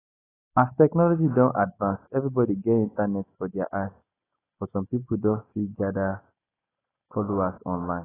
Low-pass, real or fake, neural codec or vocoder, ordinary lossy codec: 3.6 kHz; real; none; AAC, 16 kbps